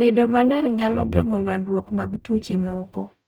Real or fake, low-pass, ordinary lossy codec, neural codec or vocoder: fake; none; none; codec, 44.1 kHz, 0.9 kbps, DAC